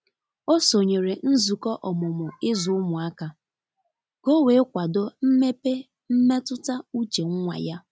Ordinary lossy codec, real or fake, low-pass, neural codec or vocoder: none; real; none; none